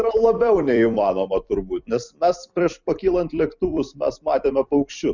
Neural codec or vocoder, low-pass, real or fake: vocoder, 44.1 kHz, 128 mel bands every 256 samples, BigVGAN v2; 7.2 kHz; fake